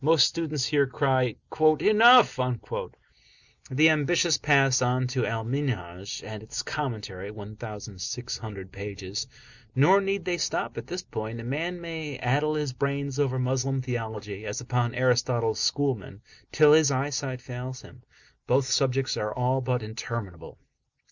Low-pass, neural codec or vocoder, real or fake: 7.2 kHz; none; real